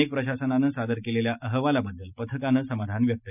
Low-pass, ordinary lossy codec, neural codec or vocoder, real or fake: 3.6 kHz; none; none; real